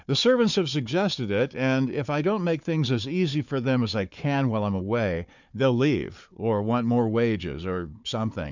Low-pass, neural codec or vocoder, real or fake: 7.2 kHz; codec, 16 kHz, 4 kbps, FunCodec, trained on Chinese and English, 50 frames a second; fake